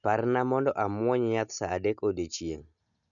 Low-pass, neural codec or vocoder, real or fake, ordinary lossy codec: 7.2 kHz; none; real; none